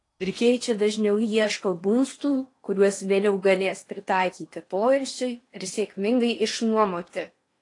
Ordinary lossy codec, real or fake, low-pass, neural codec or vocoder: AAC, 48 kbps; fake; 10.8 kHz; codec, 16 kHz in and 24 kHz out, 0.8 kbps, FocalCodec, streaming, 65536 codes